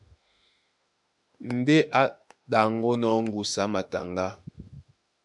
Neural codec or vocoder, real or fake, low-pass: autoencoder, 48 kHz, 32 numbers a frame, DAC-VAE, trained on Japanese speech; fake; 10.8 kHz